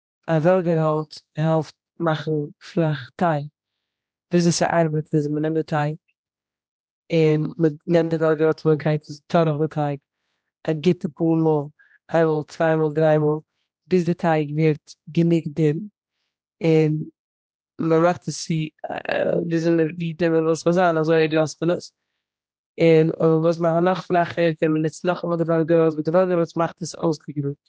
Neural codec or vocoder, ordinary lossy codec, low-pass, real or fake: codec, 16 kHz, 1 kbps, X-Codec, HuBERT features, trained on general audio; none; none; fake